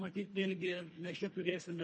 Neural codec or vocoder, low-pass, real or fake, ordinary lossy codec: codec, 24 kHz, 1.5 kbps, HILCodec; 9.9 kHz; fake; MP3, 32 kbps